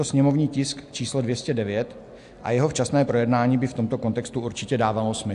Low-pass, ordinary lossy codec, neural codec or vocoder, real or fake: 10.8 kHz; AAC, 64 kbps; none; real